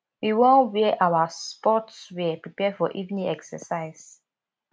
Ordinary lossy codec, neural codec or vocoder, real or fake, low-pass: none; none; real; none